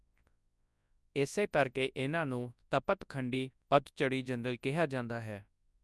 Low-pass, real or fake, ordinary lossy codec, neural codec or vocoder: none; fake; none; codec, 24 kHz, 0.9 kbps, WavTokenizer, large speech release